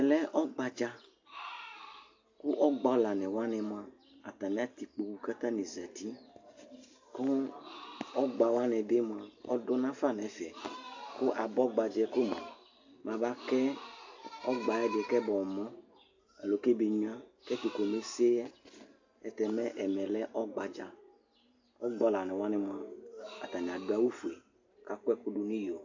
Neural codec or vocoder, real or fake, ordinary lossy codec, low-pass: none; real; AAC, 48 kbps; 7.2 kHz